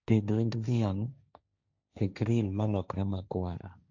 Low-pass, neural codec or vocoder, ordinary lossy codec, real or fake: 7.2 kHz; codec, 16 kHz, 1.1 kbps, Voila-Tokenizer; none; fake